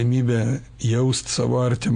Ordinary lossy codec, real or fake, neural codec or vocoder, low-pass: MP3, 64 kbps; real; none; 9.9 kHz